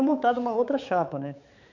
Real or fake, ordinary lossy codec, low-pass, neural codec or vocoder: fake; none; 7.2 kHz; codec, 16 kHz, 4 kbps, X-Codec, HuBERT features, trained on balanced general audio